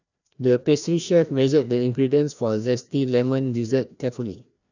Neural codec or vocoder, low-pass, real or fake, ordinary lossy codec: codec, 16 kHz, 1 kbps, FreqCodec, larger model; 7.2 kHz; fake; none